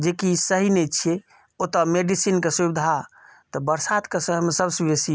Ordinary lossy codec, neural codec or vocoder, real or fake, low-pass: none; none; real; none